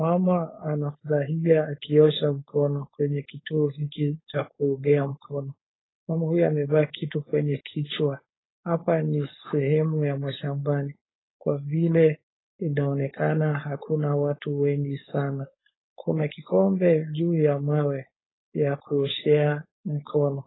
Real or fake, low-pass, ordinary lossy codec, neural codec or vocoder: fake; 7.2 kHz; AAC, 16 kbps; codec, 16 kHz, 4.8 kbps, FACodec